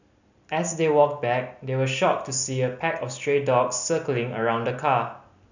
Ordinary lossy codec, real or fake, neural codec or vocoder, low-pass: none; real; none; 7.2 kHz